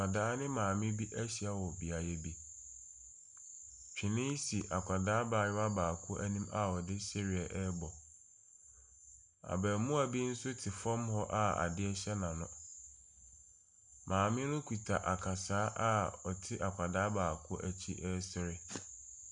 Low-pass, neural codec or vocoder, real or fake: 9.9 kHz; none; real